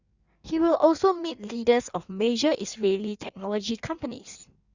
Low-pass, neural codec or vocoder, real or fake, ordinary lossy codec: 7.2 kHz; codec, 16 kHz in and 24 kHz out, 1.1 kbps, FireRedTTS-2 codec; fake; Opus, 64 kbps